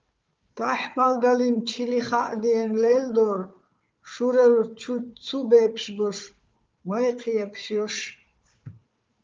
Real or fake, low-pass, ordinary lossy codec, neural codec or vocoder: fake; 7.2 kHz; Opus, 24 kbps; codec, 16 kHz, 4 kbps, FunCodec, trained on Chinese and English, 50 frames a second